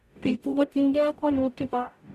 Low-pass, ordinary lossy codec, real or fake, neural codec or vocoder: 14.4 kHz; none; fake; codec, 44.1 kHz, 0.9 kbps, DAC